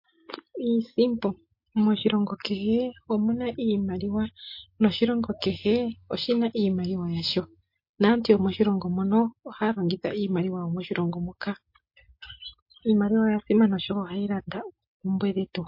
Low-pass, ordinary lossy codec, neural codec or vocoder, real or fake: 5.4 kHz; MP3, 32 kbps; none; real